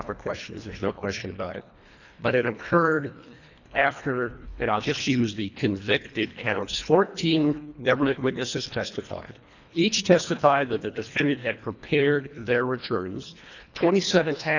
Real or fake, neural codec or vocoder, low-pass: fake; codec, 24 kHz, 1.5 kbps, HILCodec; 7.2 kHz